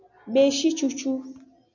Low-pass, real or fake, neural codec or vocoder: 7.2 kHz; real; none